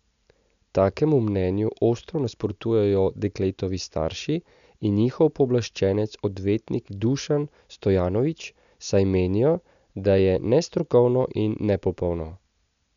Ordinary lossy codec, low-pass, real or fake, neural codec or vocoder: none; 7.2 kHz; real; none